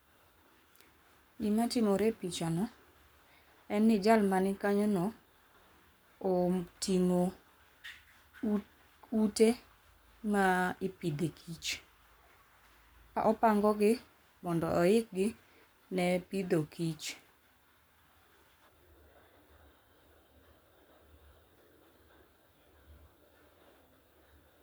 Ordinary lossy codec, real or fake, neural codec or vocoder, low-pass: none; fake; codec, 44.1 kHz, 7.8 kbps, Pupu-Codec; none